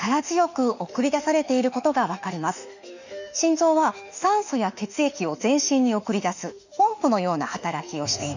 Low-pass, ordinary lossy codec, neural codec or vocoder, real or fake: 7.2 kHz; none; autoencoder, 48 kHz, 32 numbers a frame, DAC-VAE, trained on Japanese speech; fake